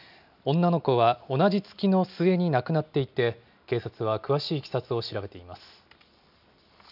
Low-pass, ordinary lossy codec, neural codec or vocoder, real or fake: 5.4 kHz; none; none; real